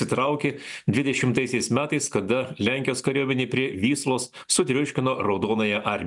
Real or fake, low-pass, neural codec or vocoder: real; 10.8 kHz; none